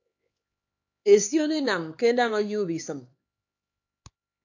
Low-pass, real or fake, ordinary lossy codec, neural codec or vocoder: 7.2 kHz; fake; AAC, 48 kbps; codec, 16 kHz, 4 kbps, X-Codec, HuBERT features, trained on LibriSpeech